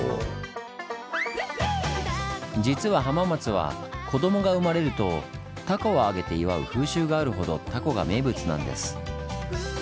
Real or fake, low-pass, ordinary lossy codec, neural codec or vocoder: real; none; none; none